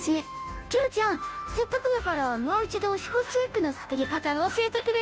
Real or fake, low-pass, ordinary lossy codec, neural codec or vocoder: fake; none; none; codec, 16 kHz, 0.5 kbps, FunCodec, trained on Chinese and English, 25 frames a second